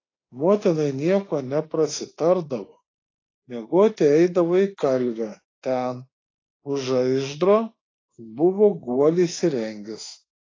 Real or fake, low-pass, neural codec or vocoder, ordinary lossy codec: fake; 7.2 kHz; autoencoder, 48 kHz, 32 numbers a frame, DAC-VAE, trained on Japanese speech; AAC, 32 kbps